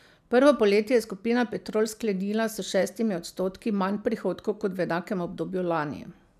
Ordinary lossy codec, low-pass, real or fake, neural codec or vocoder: none; 14.4 kHz; real; none